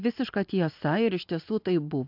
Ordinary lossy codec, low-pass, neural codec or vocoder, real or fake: MP3, 48 kbps; 5.4 kHz; none; real